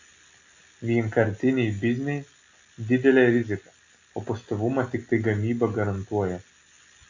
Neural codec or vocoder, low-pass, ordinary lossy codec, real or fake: none; 7.2 kHz; AAC, 48 kbps; real